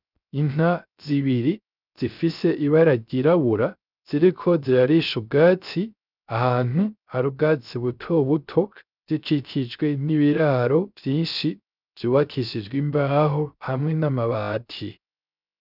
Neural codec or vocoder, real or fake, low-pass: codec, 16 kHz, 0.3 kbps, FocalCodec; fake; 5.4 kHz